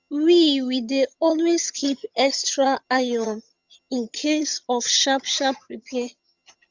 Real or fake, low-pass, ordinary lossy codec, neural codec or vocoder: fake; 7.2 kHz; Opus, 64 kbps; vocoder, 22.05 kHz, 80 mel bands, HiFi-GAN